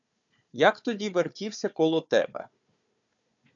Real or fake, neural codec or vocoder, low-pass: fake; codec, 16 kHz, 4 kbps, FunCodec, trained on Chinese and English, 50 frames a second; 7.2 kHz